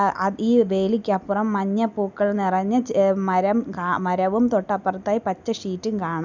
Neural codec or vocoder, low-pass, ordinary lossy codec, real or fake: none; 7.2 kHz; none; real